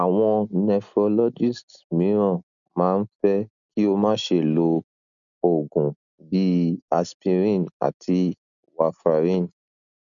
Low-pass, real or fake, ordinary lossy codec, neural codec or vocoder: 7.2 kHz; real; none; none